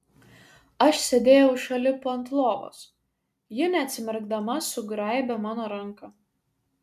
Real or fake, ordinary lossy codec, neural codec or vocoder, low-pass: real; MP3, 96 kbps; none; 14.4 kHz